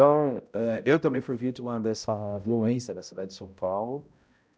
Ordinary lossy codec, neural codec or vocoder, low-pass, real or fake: none; codec, 16 kHz, 0.5 kbps, X-Codec, HuBERT features, trained on balanced general audio; none; fake